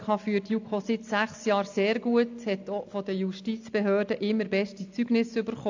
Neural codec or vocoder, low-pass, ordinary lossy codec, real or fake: none; 7.2 kHz; AAC, 48 kbps; real